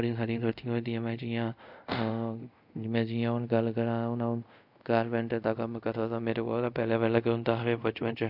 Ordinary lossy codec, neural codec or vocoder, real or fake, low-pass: none; codec, 24 kHz, 0.5 kbps, DualCodec; fake; 5.4 kHz